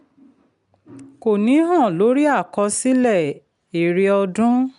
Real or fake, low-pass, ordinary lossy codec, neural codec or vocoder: real; 10.8 kHz; none; none